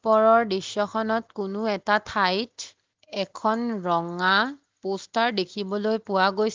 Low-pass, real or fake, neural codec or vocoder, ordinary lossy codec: 7.2 kHz; real; none; Opus, 32 kbps